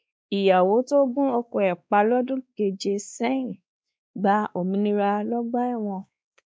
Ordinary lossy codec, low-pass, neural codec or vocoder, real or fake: none; none; codec, 16 kHz, 2 kbps, X-Codec, WavLM features, trained on Multilingual LibriSpeech; fake